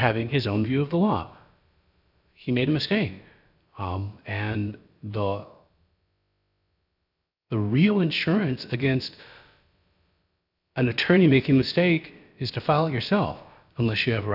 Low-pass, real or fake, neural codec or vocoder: 5.4 kHz; fake; codec, 16 kHz, about 1 kbps, DyCAST, with the encoder's durations